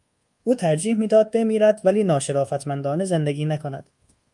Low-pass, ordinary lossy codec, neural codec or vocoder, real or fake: 10.8 kHz; Opus, 32 kbps; codec, 24 kHz, 1.2 kbps, DualCodec; fake